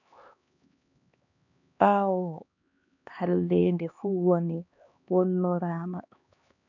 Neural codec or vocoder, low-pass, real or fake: codec, 16 kHz, 2 kbps, X-Codec, HuBERT features, trained on LibriSpeech; 7.2 kHz; fake